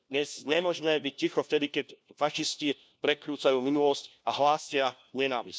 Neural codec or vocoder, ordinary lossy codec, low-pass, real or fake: codec, 16 kHz, 1 kbps, FunCodec, trained on LibriTTS, 50 frames a second; none; none; fake